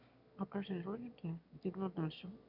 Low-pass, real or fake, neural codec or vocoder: 5.4 kHz; fake; autoencoder, 22.05 kHz, a latent of 192 numbers a frame, VITS, trained on one speaker